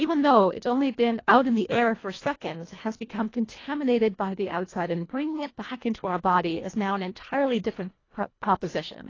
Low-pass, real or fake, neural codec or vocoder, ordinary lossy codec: 7.2 kHz; fake; codec, 24 kHz, 1.5 kbps, HILCodec; AAC, 32 kbps